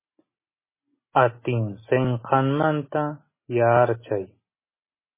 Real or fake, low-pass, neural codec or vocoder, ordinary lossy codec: real; 3.6 kHz; none; MP3, 16 kbps